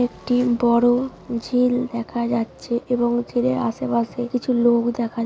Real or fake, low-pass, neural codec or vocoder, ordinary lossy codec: real; none; none; none